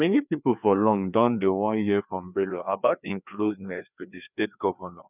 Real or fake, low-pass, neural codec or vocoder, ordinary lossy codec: fake; 3.6 kHz; codec, 16 kHz, 2 kbps, FreqCodec, larger model; none